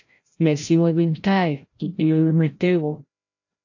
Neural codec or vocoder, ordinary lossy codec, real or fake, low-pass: codec, 16 kHz, 0.5 kbps, FreqCodec, larger model; AAC, 48 kbps; fake; 7.2 kHz